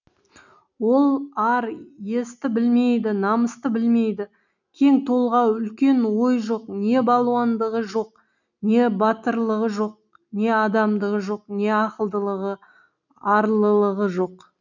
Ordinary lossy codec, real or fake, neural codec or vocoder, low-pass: none; real; none; 7.2 kHz